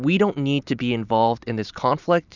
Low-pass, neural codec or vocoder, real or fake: 7.2 kHz; none; real